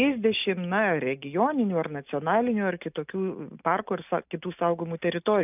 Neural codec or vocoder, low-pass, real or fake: none; 3.6 kHz; real